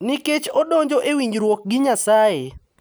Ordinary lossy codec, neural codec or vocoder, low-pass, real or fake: none; none; none; real